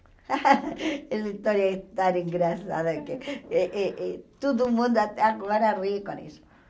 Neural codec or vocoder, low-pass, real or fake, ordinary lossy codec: none; none; real; none